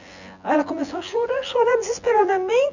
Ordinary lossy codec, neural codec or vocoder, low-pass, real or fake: none; vocoder, 24 kHz, 100 mel bands, Vocos; 7.2 kHz; fake